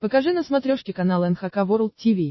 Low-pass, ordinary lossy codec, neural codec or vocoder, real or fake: 7.2 kHz; MP3, 24 kbps; none; real